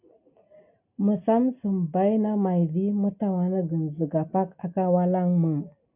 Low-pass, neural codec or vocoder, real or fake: 3.6 kHz; none; real